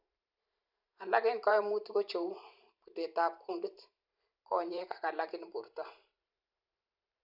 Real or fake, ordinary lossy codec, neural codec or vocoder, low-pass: fake; none; vocoder, 44.1 kHz, 128 mel bands every 512 samples, BigVGAN v2; 5.4 kHz